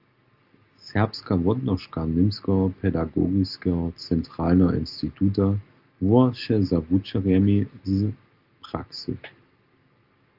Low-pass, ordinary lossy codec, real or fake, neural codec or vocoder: 5.4 kHz; Opus, 24 kbps; real; none